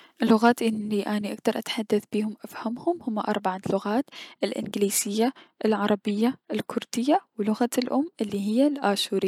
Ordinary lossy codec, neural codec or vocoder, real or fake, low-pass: none; none; real; 19.8 kHz